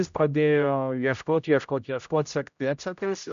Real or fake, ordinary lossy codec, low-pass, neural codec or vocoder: fake; MP3, 64 kbps; 7.2 kHz; codec, 16 kHz, 0.5 kbps, X-Codec, HuBERT features, trained on general audio